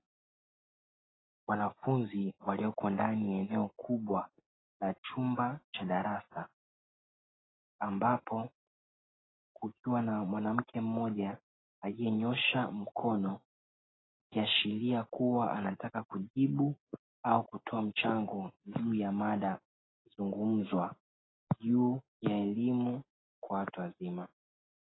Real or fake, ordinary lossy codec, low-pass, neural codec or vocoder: real; AAC, 16 kbps; 7.2 kHz; none